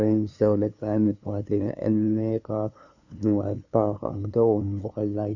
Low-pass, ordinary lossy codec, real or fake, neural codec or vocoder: 7.2 kHz; none; fake; codec, 16 kHz, 2 kbps, FunCodec, trained on LibriTTS, 25 frames a second